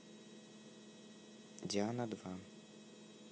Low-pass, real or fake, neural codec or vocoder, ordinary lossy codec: none; real; none; none